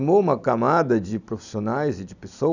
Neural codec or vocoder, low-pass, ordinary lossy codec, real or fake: none; 7.2 kHz; none; real